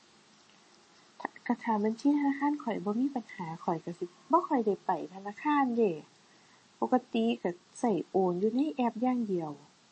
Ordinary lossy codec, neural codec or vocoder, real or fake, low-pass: MP3, 32 kbps; none; real; 10.8 kHz